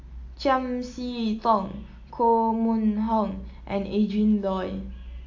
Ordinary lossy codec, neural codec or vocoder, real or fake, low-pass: AAC, 48 kbps; none; real; 7.2 kHz